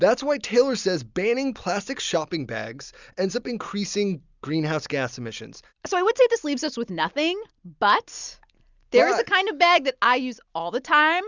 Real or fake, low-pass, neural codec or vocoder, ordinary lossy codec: real; 7.2 kHz; none; Opus, 64 kbps